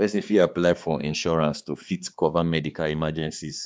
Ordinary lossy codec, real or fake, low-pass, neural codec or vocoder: none; fake; none; codec, 16 kHz, 2 kbps, X-Codec, HuBERT features, trained on balanced general audio